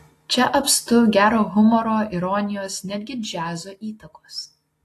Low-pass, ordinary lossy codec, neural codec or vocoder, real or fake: 14.4 kHz; AAC, 48 kbps; none; real